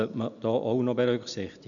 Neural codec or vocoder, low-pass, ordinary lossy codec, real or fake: none; 7.2 kHz; none; real